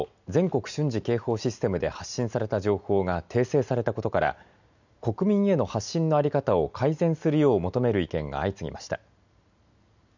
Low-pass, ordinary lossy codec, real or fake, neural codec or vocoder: 7.2 kHz; none; real; none